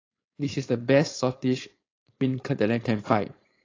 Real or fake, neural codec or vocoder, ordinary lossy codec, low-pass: fake; codec, 16 kHz, 4.8 kbps, FACodec; AAC, 32 kbps; 7.2 kHz